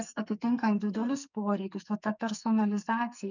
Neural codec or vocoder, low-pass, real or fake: codec, 44.1 kHz, 2.6 kbps, SNAC; 7.2 kHz; fake